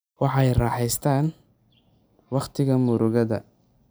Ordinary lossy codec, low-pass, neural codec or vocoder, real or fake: none; none; none; real